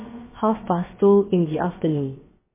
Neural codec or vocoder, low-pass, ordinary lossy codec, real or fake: codec, 16 kHz, about 1 kbps, DyCAST, with the encoder's durations; 3.6 kHz; MP3, 16 kbps; fake